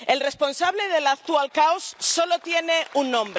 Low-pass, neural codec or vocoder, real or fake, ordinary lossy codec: none; none; real; none